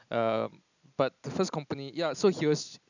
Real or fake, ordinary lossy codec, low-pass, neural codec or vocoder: real; none; 7.2 kHz; none